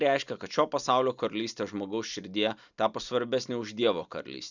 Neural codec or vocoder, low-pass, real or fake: none; 7.2 kHz; real